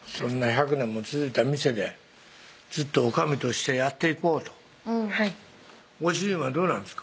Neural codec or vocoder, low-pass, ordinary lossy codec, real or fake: none; none; none; real